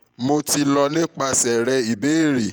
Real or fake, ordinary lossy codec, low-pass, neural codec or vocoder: fake; none; none; vocoder, 48 kHz, 128 mel bands, Vocos